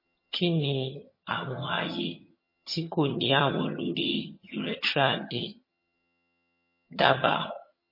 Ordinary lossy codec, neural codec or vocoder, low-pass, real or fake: MP3, 24 kbps; vocoder, 22.05 kHz, 80 mel bands, HiFi-GAN; 5.4 kHz; fake